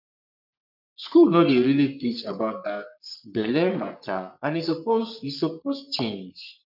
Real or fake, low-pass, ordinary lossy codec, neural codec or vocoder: fake; 5.4 kHz; none; codec, 44.1 kHz, 3.4 kbps, Pupu-Codec